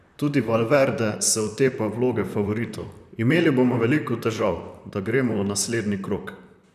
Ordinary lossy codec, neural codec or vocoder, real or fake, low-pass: none; vocoder, 44.1 kHz, 128 mel bands, Pupu-Vocoder; fake; 14.4 kHz